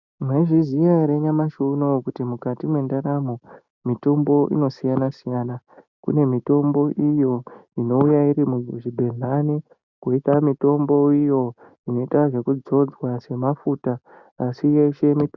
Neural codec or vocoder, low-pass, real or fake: none; 7.2 kHz; real